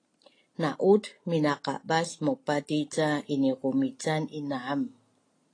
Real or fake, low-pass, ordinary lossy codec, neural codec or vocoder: real; 9.9 kHz; AAC, 32 kbps; none